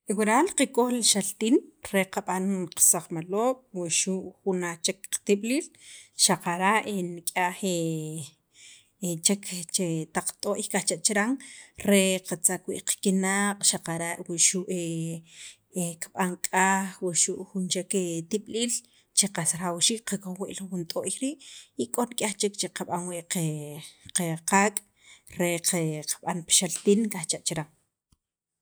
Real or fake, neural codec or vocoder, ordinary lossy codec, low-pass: real; none; none; none